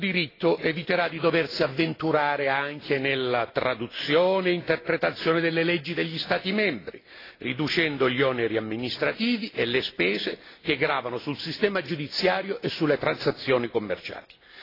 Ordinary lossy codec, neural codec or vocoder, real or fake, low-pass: AAC, 24 kbps; none; real; 5.4 kHz